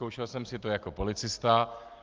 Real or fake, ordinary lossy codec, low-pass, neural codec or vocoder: real; Opus, 32 kbps; 7.2 kHz; none